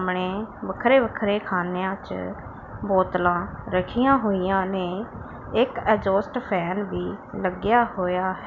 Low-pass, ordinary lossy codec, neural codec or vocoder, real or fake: 7.2 kHz; none; none; real